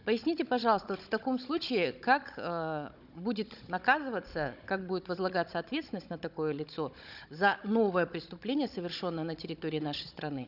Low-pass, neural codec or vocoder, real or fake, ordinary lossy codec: 5.4 kHz; codec, 16 kHz, 16 kbps, FunCodec, trained on Chinese and English, 50 frames a second; fake; none